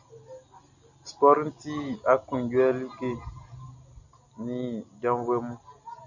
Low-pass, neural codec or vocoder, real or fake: 7.2 kHz; none; real